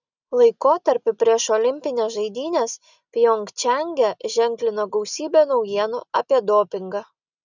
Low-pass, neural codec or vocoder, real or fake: 7.2 kHz; none; real